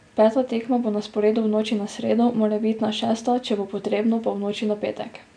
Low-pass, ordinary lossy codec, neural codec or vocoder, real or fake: 9.9 kHz; none; none; real